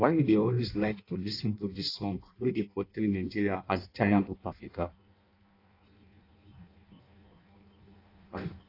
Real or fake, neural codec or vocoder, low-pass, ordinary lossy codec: fake; codec, 16 kHz in and 24 kHz out, 0.6 kbps, FireRedTTS-2 codec; 5.4 kHz; AAC, 32 kbps